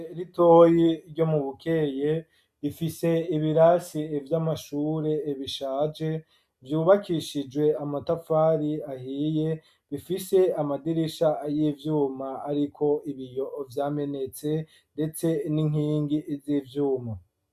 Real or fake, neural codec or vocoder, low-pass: real; none; 14.4 kHz